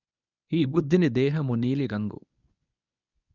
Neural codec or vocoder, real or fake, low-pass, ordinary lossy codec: codec, 24 kHz, 0.9 kbps, WavTokenizer, medium speech release version 1; fake; 7.2 kHz; none